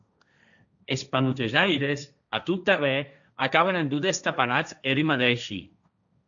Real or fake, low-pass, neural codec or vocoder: fake; 7.2 kHz; codec, 16 kHz, 1.1 kbps, Voila-Tokenizer